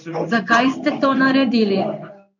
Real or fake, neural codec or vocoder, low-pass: fake; codec, 16 kHz in and 24 kHz out, 1 kbps, XY-Tokenizer; 7.2 kHz